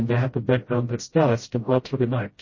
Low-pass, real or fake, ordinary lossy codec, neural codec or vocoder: 7.2 kHz; fake; MP3, 32 kbps; codec, 16 kHz, 0.5 kbps, FreqCodec, smaller model